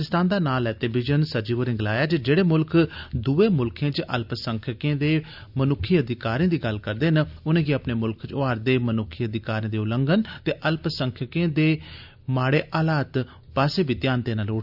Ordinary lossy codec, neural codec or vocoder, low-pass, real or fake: none; none; 5.4 kHz; real